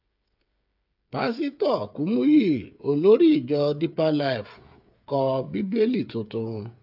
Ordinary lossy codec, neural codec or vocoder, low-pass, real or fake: AAC, 48 kbps; codec, 16 kHz, 8 kbps, FreqCodec, smaller model; 5.4 kHz; fake